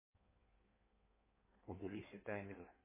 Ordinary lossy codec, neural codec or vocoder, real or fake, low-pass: MP3, 16 kbps; codec, 16 kHz in and 24 kHz out, 1.1 kbps, FireRedTTS-2 codec; fake; 3.6 kHz